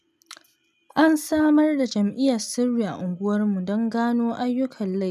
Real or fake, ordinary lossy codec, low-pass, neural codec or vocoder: real; none; 14.4 kHz; none